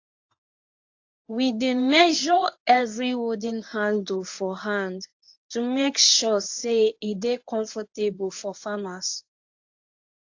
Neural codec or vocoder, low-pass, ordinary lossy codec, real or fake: codec, 24 kHz, 0.9 kbps, WavTokenizer, medium speech release version 1; 7.2 kHz; AAC, 48 kbps; fake